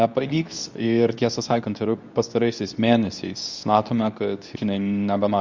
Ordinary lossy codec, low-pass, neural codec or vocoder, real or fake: Opus, 64 kbps; 7.2 kHz; codec, 24 kHz, 0.9 kbps, WavTokenizer, medium speech release version 2; fake